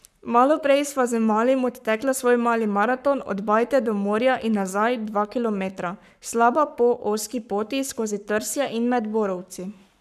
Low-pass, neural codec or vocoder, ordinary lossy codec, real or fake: 14.4 kHz; codec, 44.1 kHz, 7.8 kbps, Pupu-Codec; none; fake